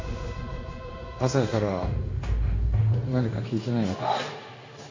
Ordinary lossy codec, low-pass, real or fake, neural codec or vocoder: AAC, 32 kbps; 7.2 kHz; fake; codec, 16 kHz in and 24 kHz out, 1 kbps, XY-Tokenizer